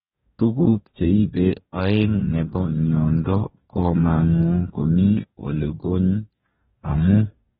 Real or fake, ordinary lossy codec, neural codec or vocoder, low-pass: fake; AAC, 16 kbps; codec, 44.1 kHz, 2.6 kbps, DAC; 19.8 kHz